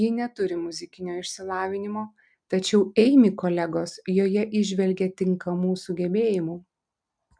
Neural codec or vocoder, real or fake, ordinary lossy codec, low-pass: none; real; MP3, 96 kbps; 9.9 kHz